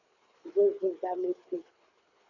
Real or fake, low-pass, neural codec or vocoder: fake; 7.2 kHz; codec, 24 kHz, 6 kbps, HILCodec